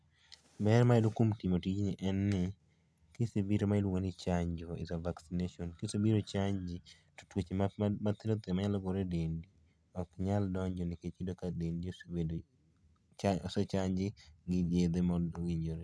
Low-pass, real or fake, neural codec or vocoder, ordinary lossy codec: none; real; none; none